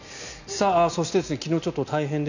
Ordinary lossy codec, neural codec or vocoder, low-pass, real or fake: none; none; 7.2 kHz; real